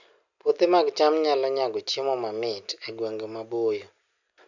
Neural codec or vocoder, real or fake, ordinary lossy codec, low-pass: none; real; none; 7.2 kHz